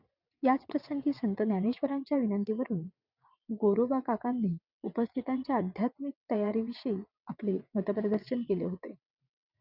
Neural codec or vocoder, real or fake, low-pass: vocoder, 22.05 kHz, 80 mel bands, WaveNeXt; fake; 5.4 kHz